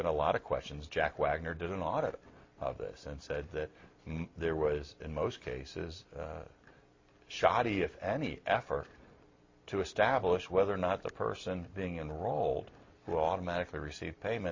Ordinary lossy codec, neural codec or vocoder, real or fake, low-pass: MP3, 32 kbps; none; real; 7.2 kHz